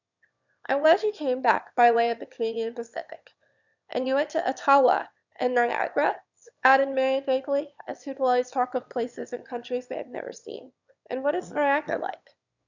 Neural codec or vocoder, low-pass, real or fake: autoencoder, 22.05 kHz, a latent of 192 numbers a frame, VITS, trained on one speaker; 7.2 kHz; fake